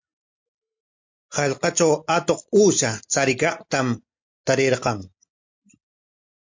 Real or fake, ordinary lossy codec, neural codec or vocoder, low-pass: real; MP3, 48 kbps; none; 7.2 kHz